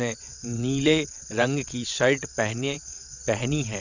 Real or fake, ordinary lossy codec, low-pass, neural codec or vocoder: fake; none; 7.2 kHz; vocoder, 22.05 kHz, 80 mel bands, WaveNeXt